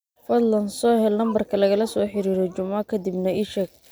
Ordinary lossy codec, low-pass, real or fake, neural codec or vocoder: none; none; real; none